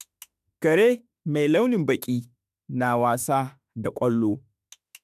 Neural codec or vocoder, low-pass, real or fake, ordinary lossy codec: autoencoder, 48 kHz, 32 numbers a frame, DAC-VAE, trained on Japanese speech; 14.4 kHz; fake; none